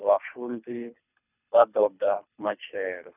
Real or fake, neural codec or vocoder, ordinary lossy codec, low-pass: fake; codec, 24 kHz, 3 kbps, HILCodec; none; 3.6 kHz